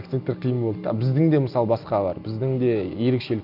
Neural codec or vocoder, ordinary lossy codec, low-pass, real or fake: none; none; 5.4 kHz; real